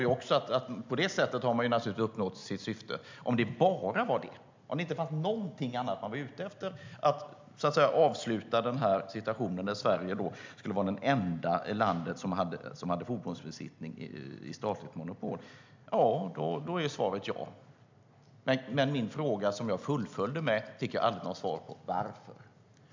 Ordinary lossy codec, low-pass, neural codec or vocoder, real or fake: MP3, 64 kbps; 7.2 kHz; none; real